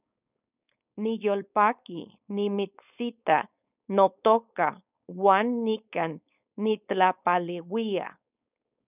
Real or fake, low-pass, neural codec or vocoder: fake; 3.6 kHz; codec, 16 kHz, 4.8 kbps, FACodec